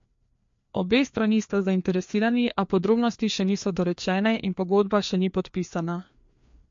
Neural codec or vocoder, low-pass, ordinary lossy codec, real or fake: codec, 16 kHz, 2 kbps, FreqCodec, larger model; 7.2 kHz; MP3, 48 kbps; fake